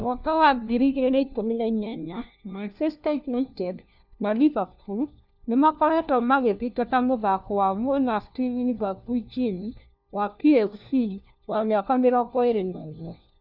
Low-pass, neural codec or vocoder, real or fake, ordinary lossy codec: 5.4 kHz; codec, 16 kHz, 1 kbps, FunCodec, trained on LibriTTS, 50 frames a second; fake; none